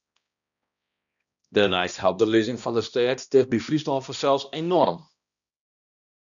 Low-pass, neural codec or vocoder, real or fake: 7.2 kHz; codec, 16 kHz, 1 kbps, X-Codec, HuBERT features, trained on balanced general audio; fake